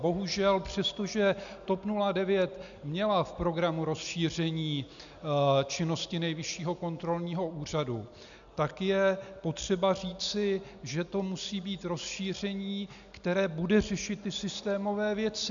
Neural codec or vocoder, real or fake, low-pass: none; real; 7.2 kHz